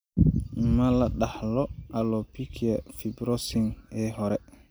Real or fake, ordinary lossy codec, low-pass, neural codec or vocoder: real; none; none; none